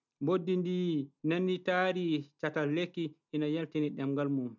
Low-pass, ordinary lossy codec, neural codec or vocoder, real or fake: 7.2 kHz; none; none; real